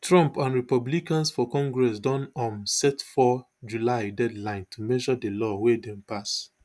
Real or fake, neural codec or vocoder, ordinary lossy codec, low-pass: real; none; none; none